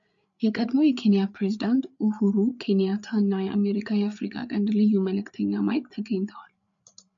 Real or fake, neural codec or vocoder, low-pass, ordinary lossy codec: fake; codec, 16 kHz, 8 kbps, FreqCodec, larger model; 7.2 kHz; AAC, 64 kbps